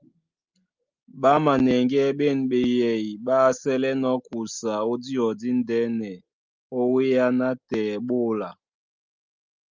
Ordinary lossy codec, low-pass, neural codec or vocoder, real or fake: Opus, 24 kbps; 7.2 kHz; none; real